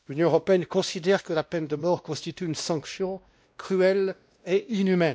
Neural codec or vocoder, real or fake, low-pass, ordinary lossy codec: codec, 16 kHz, 1 kbps, X-Codec, WavLM features, trained on Multilingual LibriSpeech; fake; none; none